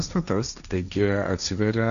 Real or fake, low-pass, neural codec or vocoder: fake; 7.2 kHz; codec, 16 kHz, 1.1 kbps, Voila-Tokenizer